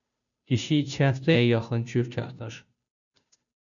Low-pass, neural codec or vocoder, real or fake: 7.2 kHz; codec, 16 kHz, 0.5 kbps, FunCodec, trained on Chinese and English, 25 frames a second; fake